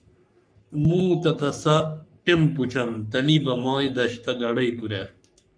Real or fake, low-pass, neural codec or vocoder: fake; 9.9 kHz; codec, 44.1 kHz, 3.4 kbps, Pupu-Codec